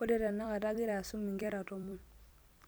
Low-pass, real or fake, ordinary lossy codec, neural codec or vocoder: none; fake; none; vocoder, 44.1 kHz, 128 mel bands every 256 samples, BigVGAN v2